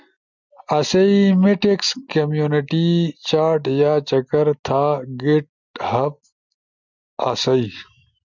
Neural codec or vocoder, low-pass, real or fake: none; 7.2 kHz; real